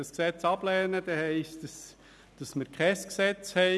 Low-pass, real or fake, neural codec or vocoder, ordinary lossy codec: none; real; none; none